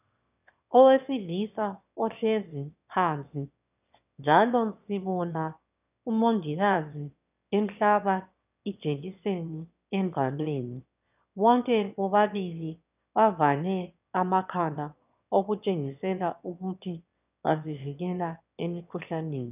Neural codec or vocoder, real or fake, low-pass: autoencoder, 22.05 kHz, a latent of 192 numbers a frame, VITS, trained on one speaker; fake; 3.6 kHz